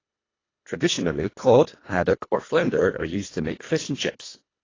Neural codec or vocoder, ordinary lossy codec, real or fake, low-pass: codec, 24 kHz, 1.5 kbps, HILCodec; AAC, 32 kbps; fake; 7.2 kHz